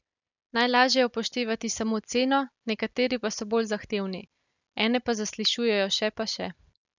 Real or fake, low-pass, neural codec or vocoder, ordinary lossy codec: real; 7.2 kHz; none; none